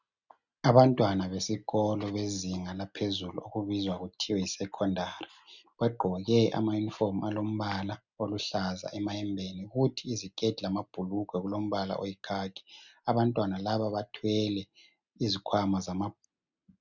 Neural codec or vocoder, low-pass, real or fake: none; 7.2 kHz; real